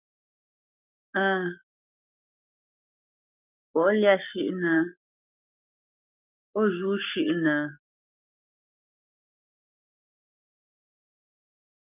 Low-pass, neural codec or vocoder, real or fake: 3.6 kHz; codec, 44.1 kHz, 7.8 kbps, Pupu-Codec; fake